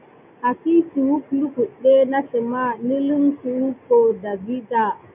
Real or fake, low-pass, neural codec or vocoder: real; 3.6 kHz; none